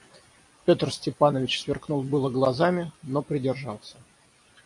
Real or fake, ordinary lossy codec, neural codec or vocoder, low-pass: real; AAC, 64 kbps; none; 10.8 kHz